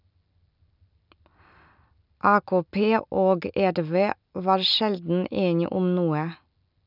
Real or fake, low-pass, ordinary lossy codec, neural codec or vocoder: real; 5.4 kHz; none; none